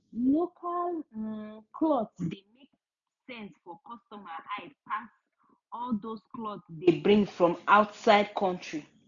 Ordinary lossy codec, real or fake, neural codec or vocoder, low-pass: MP3, 96 kbps; real; none; 7.2 kHz